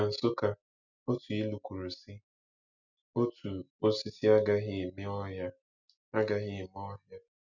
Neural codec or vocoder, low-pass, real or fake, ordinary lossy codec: none; 7.2 kHz; real; none